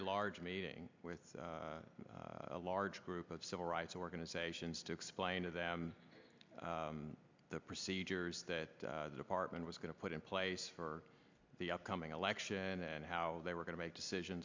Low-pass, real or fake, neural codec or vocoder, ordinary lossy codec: 7.2 kHz; real; none; MP3, 64 kbps